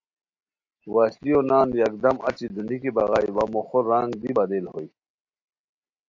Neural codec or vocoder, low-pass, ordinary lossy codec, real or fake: none; 7.2 kHz; AAC, 48 kbps; real